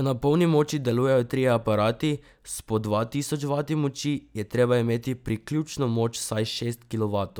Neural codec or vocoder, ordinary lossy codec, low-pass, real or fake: none; none; none; real